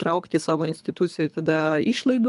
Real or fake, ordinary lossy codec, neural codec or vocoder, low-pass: fake; MP3, 96 kbps; codec, 24 kHz, 3 kbps, HILCodec; 10.8 kHz